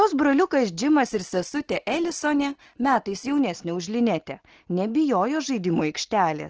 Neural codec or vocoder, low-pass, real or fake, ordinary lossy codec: none; 7.2 kHz; real; Opus, 16 kbps